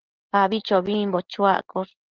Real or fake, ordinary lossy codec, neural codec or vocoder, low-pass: real; Opus, 16 kbps; none; 7.2 kHz